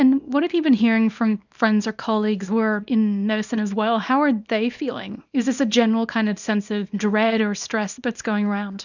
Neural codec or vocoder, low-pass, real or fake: codec, 24 kHz, 0.9 kbps, WavTokenizer, small release; 7.2 kHz; fake